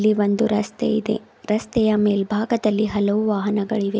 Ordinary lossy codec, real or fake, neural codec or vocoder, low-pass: none; real; none; none